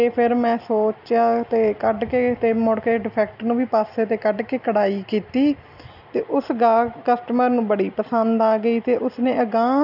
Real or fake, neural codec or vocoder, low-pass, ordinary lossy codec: real; none; 5.4 kHz; none